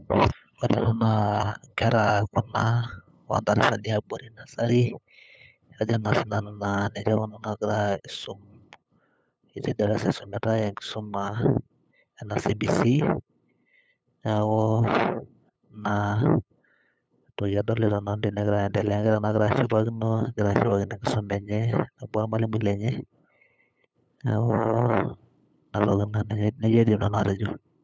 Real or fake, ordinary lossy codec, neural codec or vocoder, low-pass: fake; none; codec, 16 kHz, 8 kbps, FunCodec, trained on LibriTTS, 25 frames a second; none